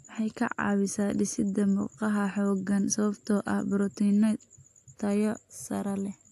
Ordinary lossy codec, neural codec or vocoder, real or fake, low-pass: AAC, 64 kbps; none; real; 14.4 kHz